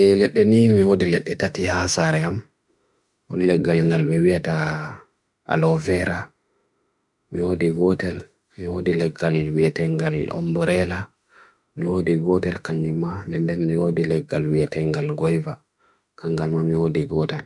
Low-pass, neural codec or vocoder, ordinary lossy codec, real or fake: 10.8 kHz; autoencoder, 48 kHz, 32 numbers a frame, DAC-VAE, trained on Japanese speech; none; fake